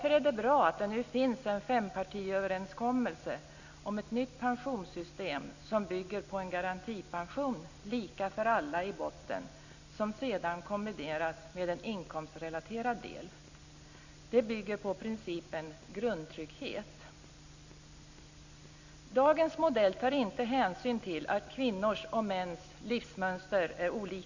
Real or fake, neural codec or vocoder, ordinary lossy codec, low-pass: real; none; none; 7.2 kHz